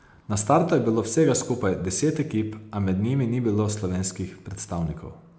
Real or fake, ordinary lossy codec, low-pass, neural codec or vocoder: real; none; none; none